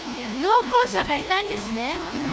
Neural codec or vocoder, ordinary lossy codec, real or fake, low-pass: codec, 16 kHz, 1 kbps, FunCodec, trained on LibriTTS, 50 frames a second; none; fake; none